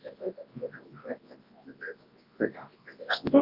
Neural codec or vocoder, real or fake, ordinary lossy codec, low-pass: codec, 24 kHz, 0.9 kbps, WavTokenizer, large speech release; fake; Opus, 24 kbps; 5.4 kHz